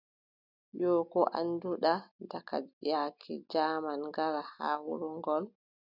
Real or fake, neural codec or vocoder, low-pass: real; none; 5.4 kHz